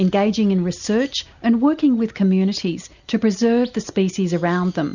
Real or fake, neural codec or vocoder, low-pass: real; none; 7.2 kHz